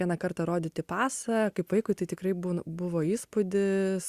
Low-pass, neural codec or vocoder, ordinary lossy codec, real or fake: 14.4 kHz; none; Opus, 64 kbps; real